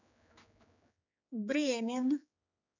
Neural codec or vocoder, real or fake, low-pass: codec, 16 kHz, 4 kbps, X-Codec, HuBERT features, trained on general audio; fake; 7.2 kHz